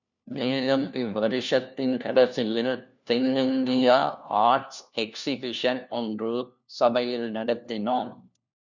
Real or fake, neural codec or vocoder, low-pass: fake; codec, 16 kHz, 1 kbps, FunCodec, trained on LibriTTS, 50 frames a second; 7.2 kHz